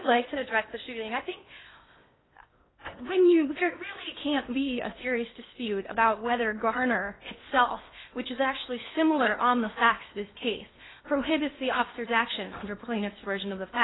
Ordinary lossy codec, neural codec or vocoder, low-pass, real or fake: AAC, 16 kbps; codec, 16 kHz in and 24 kHz out, 0.8 kbps, FocalCodec, streaming, 65536 codes; 7.2 kHz; fake